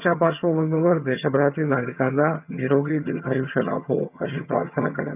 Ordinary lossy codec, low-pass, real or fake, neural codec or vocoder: none; 3.6 kHz; fake; vocoder, 22.05 kHz, 80 mel bands, HiFi-GAN